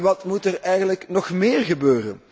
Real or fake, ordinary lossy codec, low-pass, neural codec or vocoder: real; none; none; none